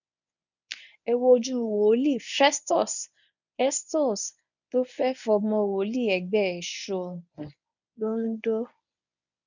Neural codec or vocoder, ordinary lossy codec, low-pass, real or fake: codec, 24 kHz, 0.9 kbps, WavTokenizer, medium speech release version 1; none; 7.2 kHz; fake